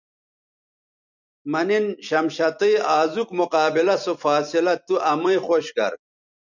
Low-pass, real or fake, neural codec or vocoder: 7.2 kHz; fake; vocoder, 44.1 kHz, 128 mel bands every 256 samples, BigVGAN v2